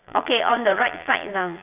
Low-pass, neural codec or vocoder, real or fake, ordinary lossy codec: 3.6 kHz; vocoder, 22.05 kHz, 80 mel bands, Vocos; fake; none